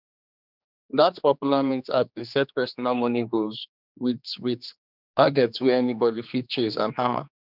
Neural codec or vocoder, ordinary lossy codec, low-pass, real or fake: codec, 16 kHz, 2 kbps, X-Codec, HuBERT features, trained on general audio; none; 5.4 kHz; fake